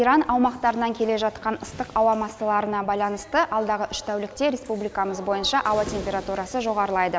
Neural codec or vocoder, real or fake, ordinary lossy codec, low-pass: none; real; none; none